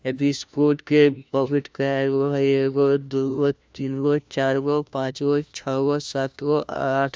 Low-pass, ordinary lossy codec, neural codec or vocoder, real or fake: none; none; codec, 16 kHz, 1 kbps, FunCodec, trained on LibriTTS, 50 frames a second; fake